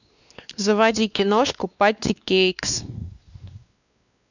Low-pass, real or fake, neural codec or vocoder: 7.2 kHz; fake; codec, 16 kHz, 2 kbps, X-Codec, WavLM features, trained on Multilingual LibriSpeech